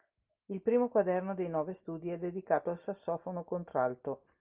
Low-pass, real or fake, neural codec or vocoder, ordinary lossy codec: 3.6 kHz; real; none; Opus, 24 kbps